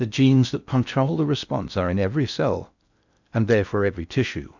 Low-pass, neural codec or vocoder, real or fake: 7.2 kHz; codec, 16 kHz in and 24 kHz out, 0.6 kbps, FocalCodec, streaming, 4096 codes; fake